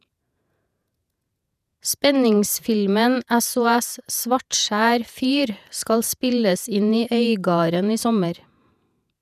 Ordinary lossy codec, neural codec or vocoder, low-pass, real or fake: none; vocoder, 48 kHz, 128 mel bands, Vocos; 14.4 kHz; fake